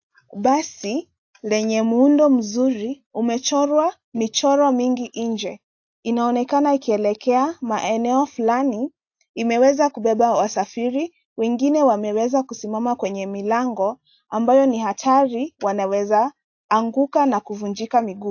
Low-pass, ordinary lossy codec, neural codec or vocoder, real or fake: 7.2 kHz; AAC, 48 kbps; none; real